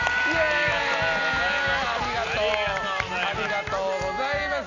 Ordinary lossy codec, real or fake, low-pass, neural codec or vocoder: none; real; 7.2 kHz; none